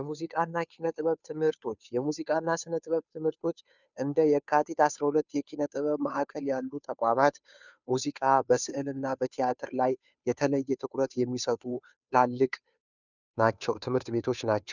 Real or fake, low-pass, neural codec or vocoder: fake; 7.2 kHz; codec, 16 kHz, 2 kbps, FunCodec, trained on Chinese and English, 25 frames a second